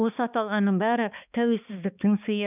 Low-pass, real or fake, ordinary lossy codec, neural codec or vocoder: 3.6 kHz; fake; none; codec, 16 kHz, 2 kbps, X-Codec, HuBERT features, trained on balanced general audio